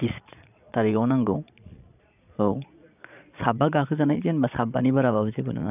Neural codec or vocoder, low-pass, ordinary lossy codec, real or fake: vocoder, 22.05 kHz, 80 mel bands, Vocos; 3.6 kHz; none; fake